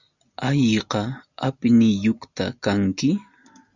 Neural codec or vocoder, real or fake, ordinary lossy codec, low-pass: none; real; Opus, 64 kbps; 7.2 kHz